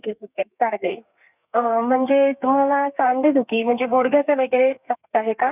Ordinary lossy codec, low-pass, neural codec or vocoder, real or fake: none; 3.6 kHz; codec, 32 kHz, 1.9 kbps, SNAC; fake